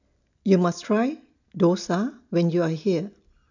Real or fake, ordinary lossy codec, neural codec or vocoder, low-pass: real; none; none; 7.2 kHz